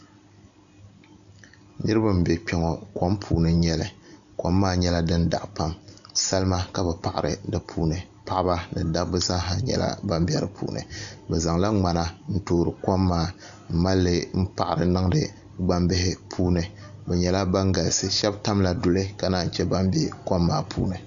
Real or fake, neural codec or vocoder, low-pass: real; none; 7.2 kHz